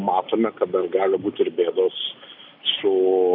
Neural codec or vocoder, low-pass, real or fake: none; 5.4 kHz; real